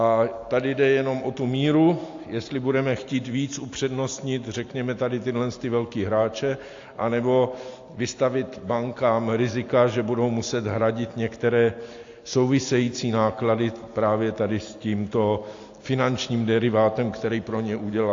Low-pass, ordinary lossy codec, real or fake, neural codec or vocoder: 7.2 kHz; AAC, 48 kbps; real; none